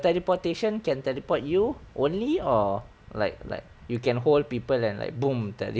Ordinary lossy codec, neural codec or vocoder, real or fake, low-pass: none; none; real; none